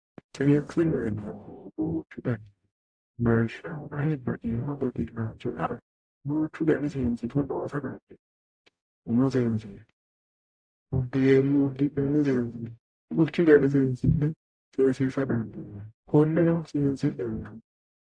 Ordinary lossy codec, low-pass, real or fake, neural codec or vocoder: AAC, 64 kbps; 9.9 kHz; fake; codec, 44.1 kHz, 0.9 kbps, DAC